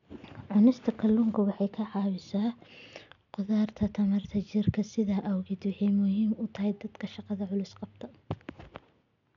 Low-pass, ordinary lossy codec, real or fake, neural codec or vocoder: 7.2 kHz; none; real; none